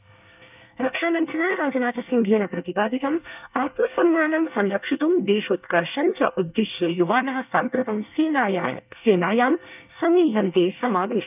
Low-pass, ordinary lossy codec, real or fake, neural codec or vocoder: 3.6 kHz; none; fake; codec, 24 kHz, 1 kbps, SNAC